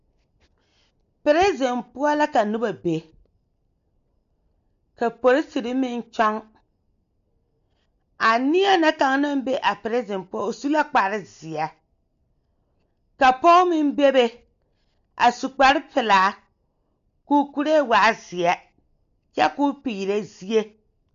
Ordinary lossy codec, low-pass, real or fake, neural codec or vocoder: AAC, 96 kbps; 7.2 kHz; real; none